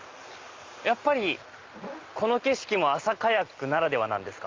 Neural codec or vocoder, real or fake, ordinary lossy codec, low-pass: none; real; Opus, 32 kbps; 7.2 kHz